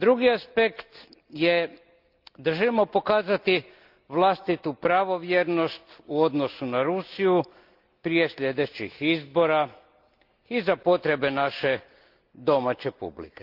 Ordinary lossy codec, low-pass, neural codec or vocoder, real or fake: Opus, 32 kbps; 5.4 kHz; none; real